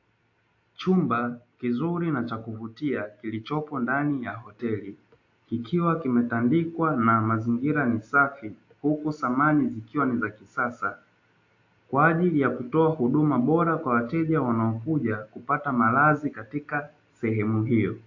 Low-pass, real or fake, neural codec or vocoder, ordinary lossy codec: 7.2 kHz; real; none; AAC, 48 kbps